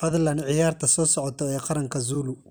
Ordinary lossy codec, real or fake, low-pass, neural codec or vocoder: none; fake; none; vocoder, 44.1 kHz, 128 mel bands every 256 samples, BigVGAN v2